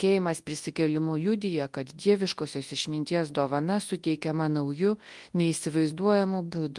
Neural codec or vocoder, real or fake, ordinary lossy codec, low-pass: codec, 24 kHz, 0.9 kbps, WavTokenizer, large speech release; fake; Opus, 24 kbps; 10.8 kHz